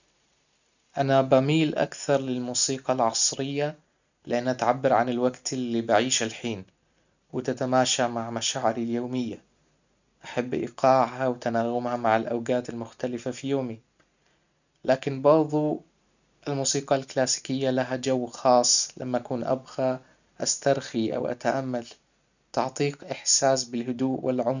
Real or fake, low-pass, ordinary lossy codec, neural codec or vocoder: real; 7.2 kHz; none; none